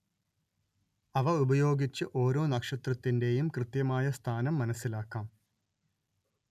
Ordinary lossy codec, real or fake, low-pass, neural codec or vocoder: none; real; 14.4 kHz; none